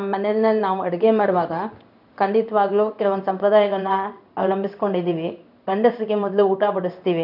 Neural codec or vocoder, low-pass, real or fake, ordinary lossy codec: codec, 16 kHz in and 24 kHz out, 1 kbps, XY-Tokenizer; 5.4 kHz; fake; none